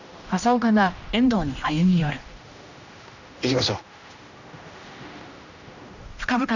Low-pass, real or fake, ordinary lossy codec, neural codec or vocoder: 7.2 kHz; fake; none; codec, 16 kHz, 1 kbps, X-Codec, HuBERT features, trained on general audio